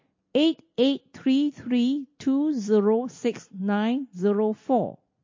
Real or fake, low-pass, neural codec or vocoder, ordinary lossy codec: real; 7.2 kHz; none; MP3, 32 kbps